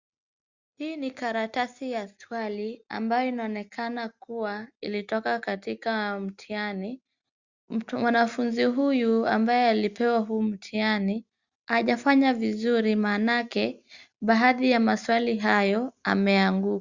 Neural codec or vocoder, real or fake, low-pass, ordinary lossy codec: none; real; 7.2 kHz; Opus, 64 kbps